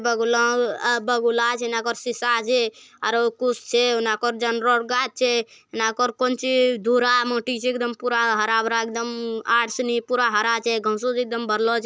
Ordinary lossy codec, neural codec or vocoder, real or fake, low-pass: none; none; real; none